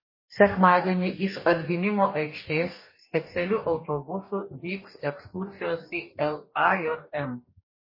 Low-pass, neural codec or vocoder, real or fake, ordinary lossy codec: 5.4 kHz; codec, 44.1 kHz, 2.6 kbps, DAC; fake; MP3, 24 kbps